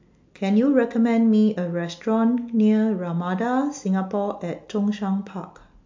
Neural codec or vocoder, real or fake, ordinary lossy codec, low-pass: none; real; MP3, 48 kbps; 7.2 kHz